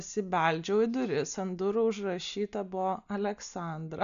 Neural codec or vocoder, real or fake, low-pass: none; real; 7.2 kHz